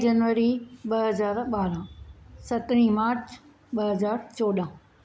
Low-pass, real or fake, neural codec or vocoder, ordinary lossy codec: none; real; none; none